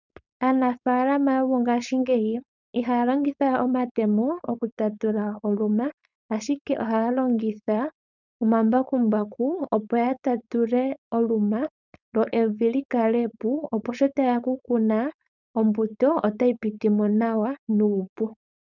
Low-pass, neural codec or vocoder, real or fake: 7.2 kHz; codec, 16 kHz, 4.8 kbps, FACodec; fake